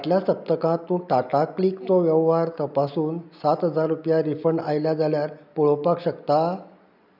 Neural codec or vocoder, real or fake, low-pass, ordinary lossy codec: none; real; 5.4 kHz; none